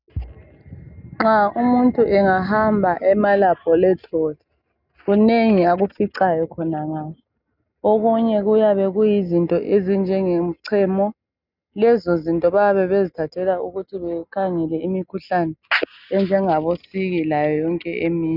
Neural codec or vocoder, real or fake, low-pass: none; real; 5.4 kHz